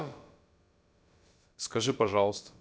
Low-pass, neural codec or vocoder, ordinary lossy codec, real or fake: none; codec, 16 kHz, about 1 kbps, DyCAST, with the encoder's durations; none; fake